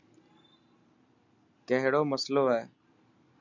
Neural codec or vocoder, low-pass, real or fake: none; 7.2 kHz; real